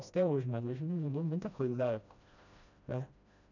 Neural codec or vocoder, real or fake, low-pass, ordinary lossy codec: codec, 16 kHz, 1 kbps, FreqCodec, smaller model; fake; 7.2 kHz; none